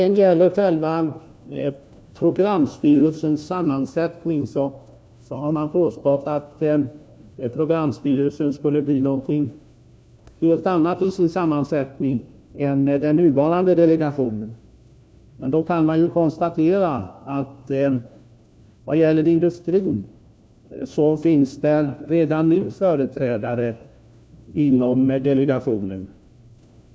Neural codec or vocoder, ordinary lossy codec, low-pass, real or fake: codec, 16 kHz, 1 kbps, FunCodec, trained on LibriTTS, 50 frames a second; none; none; fake